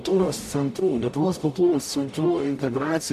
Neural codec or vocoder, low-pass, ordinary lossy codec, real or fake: codec, 44.1 kHz, 0.9 kbps, DAC; 14.4 kHz; MP3, 64 kbps; fake